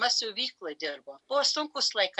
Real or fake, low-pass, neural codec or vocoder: real; 10.8 kHz; none